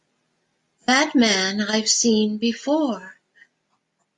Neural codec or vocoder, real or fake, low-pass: none; real; 10.8 kHz